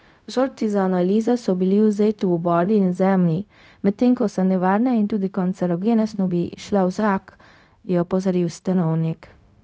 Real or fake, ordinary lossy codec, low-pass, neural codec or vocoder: fake; none; none; codec, 16 kHz, 0.4 kbps, LongCat-Audio-Codec